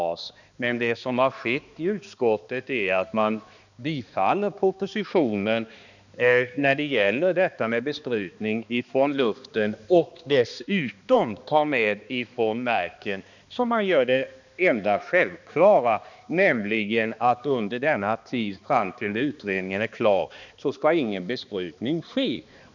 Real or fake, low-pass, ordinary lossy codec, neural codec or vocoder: fake; 7.2 kHz; none; codec, 16 kHz, 2 kbps, X-Codec, HuBERT features, trained on balanced general audio